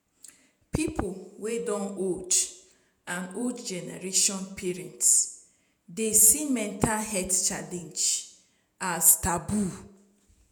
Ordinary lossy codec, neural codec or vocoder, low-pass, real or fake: none; vocoder, 48 kHz, 128 mel bands, Vocos; none; fake